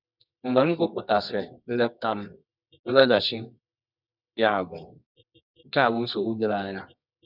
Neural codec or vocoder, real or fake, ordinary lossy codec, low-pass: codec, 24 kHz, 0.9 kbps, WavTokenizer, medium music audio release; fake; none; 5.4 kHz